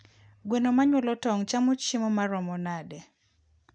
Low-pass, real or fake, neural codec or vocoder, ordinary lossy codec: 9.9 kHz; real; none; none